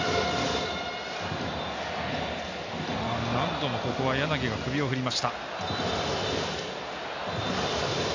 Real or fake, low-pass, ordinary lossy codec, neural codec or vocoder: real; 7.2 kHz; AAC, 48 kbps; none